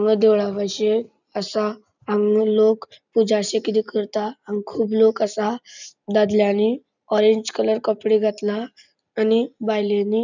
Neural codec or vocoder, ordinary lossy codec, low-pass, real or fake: none; none; 7.2 kHz; real